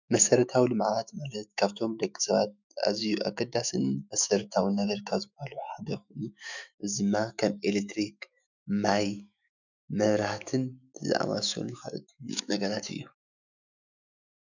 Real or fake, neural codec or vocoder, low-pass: fake; autoencoder, 48 kHz, 128 numbers a frame, DAC-VAE, trained on Japanese speech; 7.2 kHz